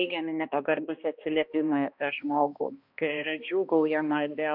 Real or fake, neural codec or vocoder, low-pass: fake; codec, 16 kHz, 1 kbps, X-Codec, HuBERT features, trained on balanced general audio; 5.4 kHz